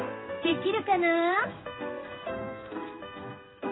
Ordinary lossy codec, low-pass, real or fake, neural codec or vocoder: AAC, 16 kbps; 7.2 kHz; fake; codec, 16 kHz in and 24 kHz out, 1 kbps, XY-Tokenizer